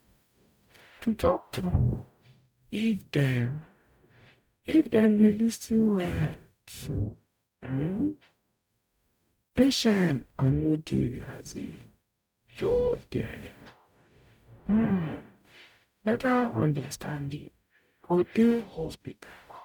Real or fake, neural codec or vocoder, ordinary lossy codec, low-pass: fake; codec, 44.1 kHz, 0.9 kbps, DAC; none; 19.8 kHz